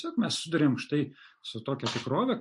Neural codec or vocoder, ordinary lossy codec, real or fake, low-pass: none; MP3, 48 kbps; real; 10.8 kHz